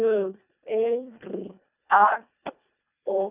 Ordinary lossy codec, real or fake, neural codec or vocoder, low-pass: none; fake; codec, 24 kHz, 1.5 kbps, HILCodec; 3.6 kHz